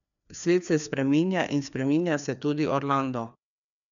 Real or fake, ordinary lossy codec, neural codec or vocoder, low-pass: fake; none; codec, 16 kHz, 2 kbps, FreqCodec, larger model; 7.2 kHz